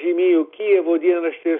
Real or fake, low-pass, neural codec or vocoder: real; 5.4 kHz; none